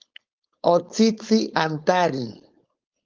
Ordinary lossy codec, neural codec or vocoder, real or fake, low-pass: Opus, 32 kbps; codec, 16 kHz, 4.8 kbps, FACodec; fake; 7.2 kHz